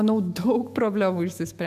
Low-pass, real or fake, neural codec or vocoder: 14.4 kHz; fake; autoencoder, 48 kHz, 128 numbers a frame, DAC-VAE, trained on Japanese speech